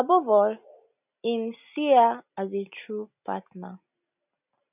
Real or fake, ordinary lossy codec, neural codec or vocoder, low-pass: real; none; none; 3.6 kHz